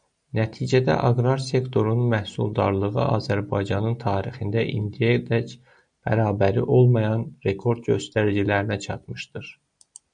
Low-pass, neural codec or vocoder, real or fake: 9.9 kHz; none; real